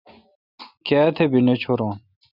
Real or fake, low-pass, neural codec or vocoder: real; 5.4 kHz; none